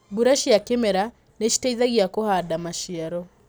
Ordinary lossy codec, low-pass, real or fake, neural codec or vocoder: none; none; real; none